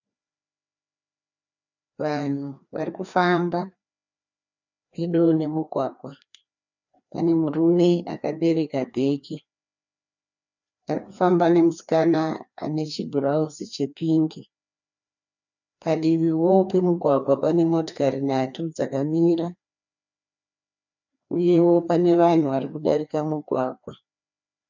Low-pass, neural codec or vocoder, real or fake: 7.2 kHz; codec, 16 kHz, 2 kbps, FreqCodec, larger model; fake